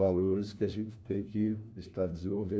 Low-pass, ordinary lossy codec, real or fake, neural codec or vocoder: none; none; fake; codec, 16 kHz, 1 kbps, FunCodec, trained on LibriTTS, 50 frames a second